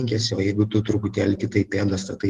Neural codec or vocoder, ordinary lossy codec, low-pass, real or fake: none; Opus, 16 kbps; 14.4 kHz; real